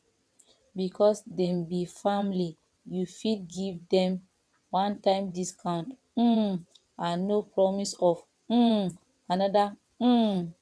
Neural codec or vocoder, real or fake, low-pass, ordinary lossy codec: vocoder, 22.05 kHz, 80 mel bands, WaveNeXt; fake; none; none